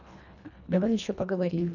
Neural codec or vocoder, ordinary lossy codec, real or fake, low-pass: codec, 24 kHz, 1.5 kbps, HILCodec; none; fake; 7.2 kHz